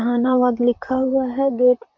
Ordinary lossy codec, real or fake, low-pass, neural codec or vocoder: none; fake; 7.2 kHz; vocoder, 44.1 kHz, 128 mel bands, Pupu-Vocoder